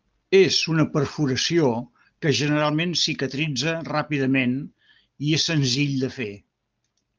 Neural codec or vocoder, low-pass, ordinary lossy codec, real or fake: none; 7.2 kHz; Opus, 32 kbps; real